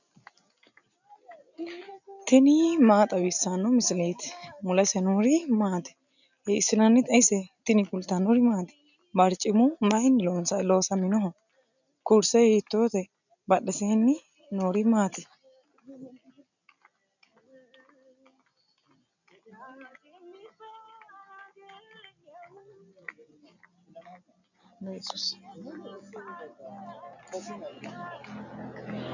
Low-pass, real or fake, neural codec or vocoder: 7.2 kHz; real; none